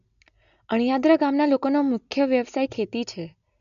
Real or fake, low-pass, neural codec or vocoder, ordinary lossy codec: real; 7.2 kHz; none; MP3, 96 kbps